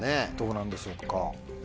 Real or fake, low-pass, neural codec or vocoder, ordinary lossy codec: real; none; none; none